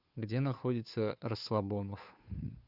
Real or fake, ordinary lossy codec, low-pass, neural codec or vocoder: fake; Opus, 64 kbps; 5.4 kHz; codec, 16 kHz, 2 kbps, FunCodec, trained on Chinese and English, 25 frames a second